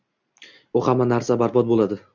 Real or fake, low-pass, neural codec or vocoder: real; 7.2 kHz; none